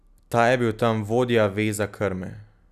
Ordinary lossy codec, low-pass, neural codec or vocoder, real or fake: none; 14.4 kHz; none; real